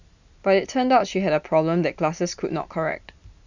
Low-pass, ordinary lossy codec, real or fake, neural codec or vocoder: 7.2 kHz; none; real; none